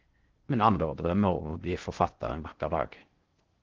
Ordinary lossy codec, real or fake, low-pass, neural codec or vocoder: Opus, 16 kbps; fake; 7.2 kHz; codec, 16 kHz in and 24 kHz out, 0.6 kbps, FocalCodec, streaming, 4096 codes